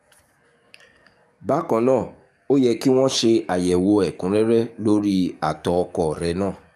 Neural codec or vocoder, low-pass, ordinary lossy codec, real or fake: codec, 44.1 kHz, 7.8 kbps, DAC; 14.4 kHz; none; fake